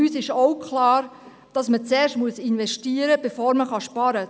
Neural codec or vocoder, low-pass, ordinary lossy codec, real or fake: none; none; none; real